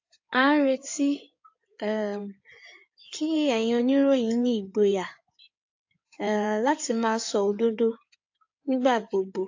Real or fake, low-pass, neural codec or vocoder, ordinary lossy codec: fake; 7.2 kHz; codec, 16 kHz in and 24 kHz out, 2.2 kbps, FireRedTTS-2 codec; AAC, 48 kbps